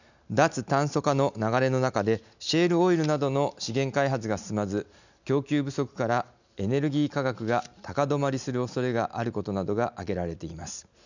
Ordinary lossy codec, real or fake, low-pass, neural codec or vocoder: none; real; 7.2 kHz; none